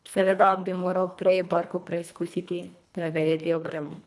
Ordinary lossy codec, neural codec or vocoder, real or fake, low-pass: none; codec, 24 kHz, 1.5 kbps, HILCodec; fake; none